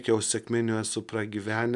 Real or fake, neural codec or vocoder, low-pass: real; none; 10.8 kHz